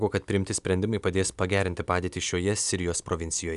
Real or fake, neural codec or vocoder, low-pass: real; none; 10.8 kHz